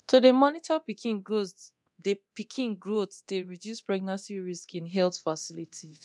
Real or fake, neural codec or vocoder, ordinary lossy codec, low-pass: fake; codec, 24 kHz, 0.9 kbps, DualCodec; none; none